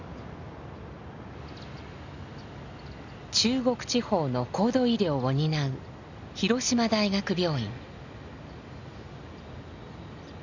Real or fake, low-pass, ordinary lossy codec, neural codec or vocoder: real; 7.2 kHz; none; none